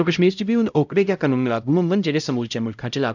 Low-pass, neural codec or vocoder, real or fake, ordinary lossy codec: 7.2 kHz; codec, 16 kHz, 0.5 kbps, X-Codec, HuBERT features, trained on LibriSpeech; fake; none